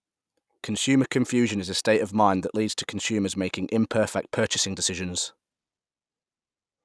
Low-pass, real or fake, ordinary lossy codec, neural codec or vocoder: none; real; none; none